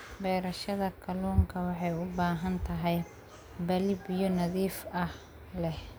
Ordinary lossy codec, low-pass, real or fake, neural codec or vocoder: none; none; real; none